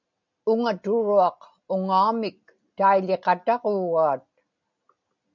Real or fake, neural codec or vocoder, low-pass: real; none; 7.2 kHz